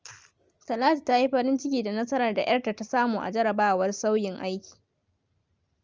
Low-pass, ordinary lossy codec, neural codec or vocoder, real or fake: 7.2 kHz; Opus, 24 kbps; none; real